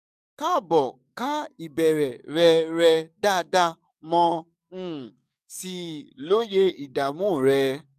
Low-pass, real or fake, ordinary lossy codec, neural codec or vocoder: 14.4 kHz; fake; none; codec, 44.1 kHz, 7.8 kbps, DAC